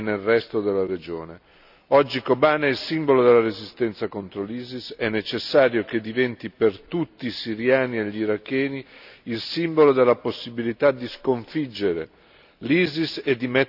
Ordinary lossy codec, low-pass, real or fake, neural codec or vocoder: none; 5.4 kHz; real; none